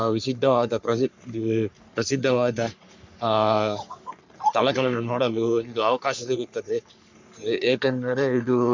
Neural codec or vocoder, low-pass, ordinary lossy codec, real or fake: codec, 44.1 kHz, 3.4 kbps, Pupu-Codec; 7.2 kHz; MP3, 64 kbps; fake